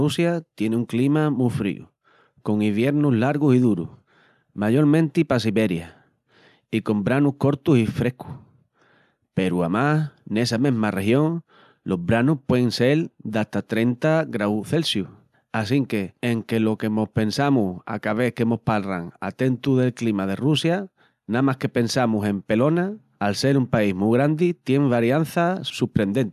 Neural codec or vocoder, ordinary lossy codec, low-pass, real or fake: none; none; 14.4 kHz; real